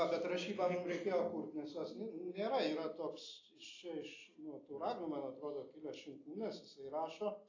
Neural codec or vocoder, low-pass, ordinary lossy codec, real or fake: none; 7.2 kHz; AAC, 32 kbps; real